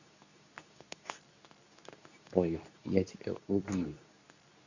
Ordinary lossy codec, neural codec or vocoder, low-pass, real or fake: none; codec, 24 kHz, 0.9 kbps, WavTokenizer, medium speech release version 2; 7.2 kHz; fake